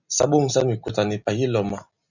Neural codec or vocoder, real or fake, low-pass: none; real; 7.2 kHz